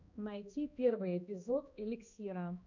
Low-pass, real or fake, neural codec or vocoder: 7.2 kHz; fake; codec, 16 kHz, 1 kbps, X-Codec, HuBERT features, trained on balanced general audio